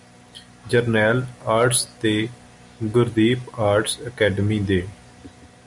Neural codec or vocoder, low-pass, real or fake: none; 10.8 kHz; real